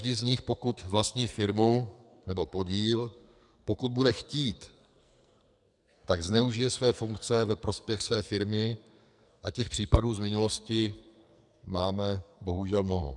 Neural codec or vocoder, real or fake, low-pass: codec, 44.1 kHz, 2.6 kbps, SNAC; fake; 10.8 kHz